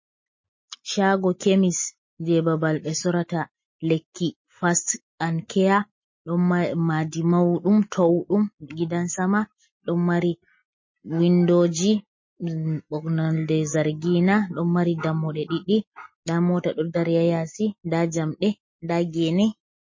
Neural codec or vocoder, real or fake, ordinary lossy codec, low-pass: none; real; MP3, 32 kbps; 7.2 kHz